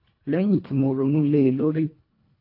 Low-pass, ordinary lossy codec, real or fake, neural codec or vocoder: 5.4 kHz; AAC, 32 kbps; fake; codec, 24 kHz, 1.5 kbps, HILCodec